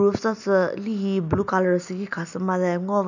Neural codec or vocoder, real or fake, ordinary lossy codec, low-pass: none; real; none; 7.2 kHz